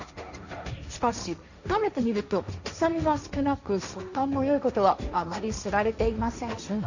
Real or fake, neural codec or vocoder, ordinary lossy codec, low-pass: fake; codec, 16 kHz, 1.1 kbps, Voila-Tokenizer; none; 7.2 kHz